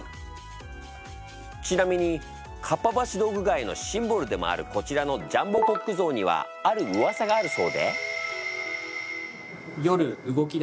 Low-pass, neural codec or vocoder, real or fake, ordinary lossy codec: none; none; real; none